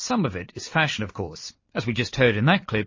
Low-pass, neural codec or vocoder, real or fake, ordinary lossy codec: 7.2 kHz; none; real; MP3, 32 kbps